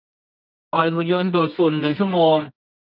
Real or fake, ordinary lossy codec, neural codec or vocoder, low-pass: fake; none; codec, 24 kHz, 0.9 kbps, WavTokenizer, medium music audio release; 5.4 kHz